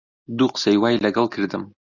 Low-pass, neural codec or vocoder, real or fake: 7.2 kHz; none; real